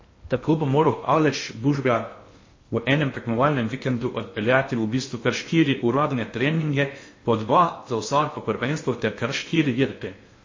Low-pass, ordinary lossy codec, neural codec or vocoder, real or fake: 7.2 kHz; MP3, 32 kbps; codec, 16 kHz in and 24 kHz out, 0.6 kbps, FocalCodec, streaming, 2048 codes; fake